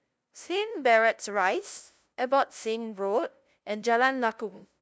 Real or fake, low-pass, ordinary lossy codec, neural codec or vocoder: fake; none; none; codec, 16 kHz, 0.5 kbps, FunCodec, trained on LibriTTS, 25 frames a second